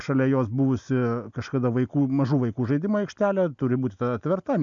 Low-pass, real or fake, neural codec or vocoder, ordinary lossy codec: 7.2 kHz; real; none; AAC, 64 kbps